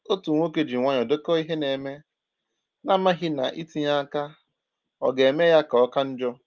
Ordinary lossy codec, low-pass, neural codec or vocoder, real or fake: Opus, 32 kbps; 7.2 kHz; none; real